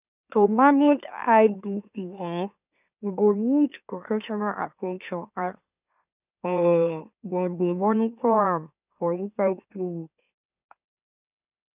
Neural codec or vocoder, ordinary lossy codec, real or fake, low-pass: autoencoder, 44.1 kHz, a latent of 192 numbers a frame, MeloTTS; none; fake; 3.6 kHz